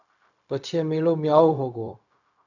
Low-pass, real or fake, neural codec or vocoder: 7.2 kHz; fake; codec, 16 kHz, 0.4 kbps, LongCat-Audio-Codec